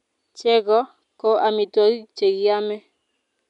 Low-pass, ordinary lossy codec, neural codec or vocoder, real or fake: 10.8 kHz; none; none; real